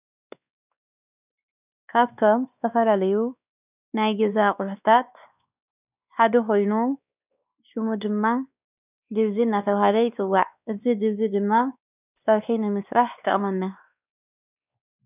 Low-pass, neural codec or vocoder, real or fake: 3.6 kHz; codec, 16 kHz, 2 kbps, X-Codec, WavLM features, trained on Multilingual LibriSpeech; fake